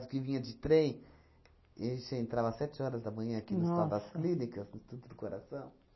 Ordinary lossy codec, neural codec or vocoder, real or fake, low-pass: MP3, 24 kbps; none; real; 7.2 kHz